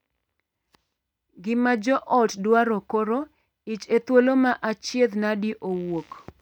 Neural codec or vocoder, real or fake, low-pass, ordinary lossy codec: none; real; 19.8 kHz; none